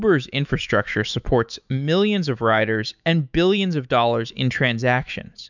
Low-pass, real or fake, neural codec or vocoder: 7.2 kHz; fake; autoencoder, 48 kHz, 128 numbers a frame, DAC-VAE, trained on Japanese speech